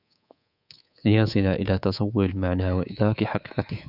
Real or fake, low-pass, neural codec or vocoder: fake; 5.4 kHz; codec, 24 kHz, 3.1 kbps, DualCodec